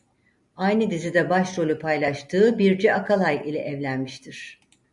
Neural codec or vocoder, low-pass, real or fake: none; 10.8 kHz; real